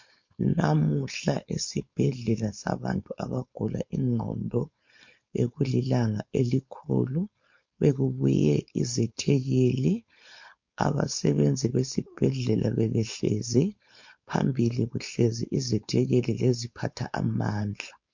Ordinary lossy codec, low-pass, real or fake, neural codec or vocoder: MP3, 48 kbps; 7.2 kHz; fake; codec, 16 kHz, 4.8 kbps, FACodec